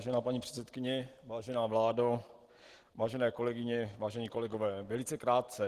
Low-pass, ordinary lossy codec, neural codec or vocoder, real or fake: 14.4 kHz; Opus, 16 kbps; none; real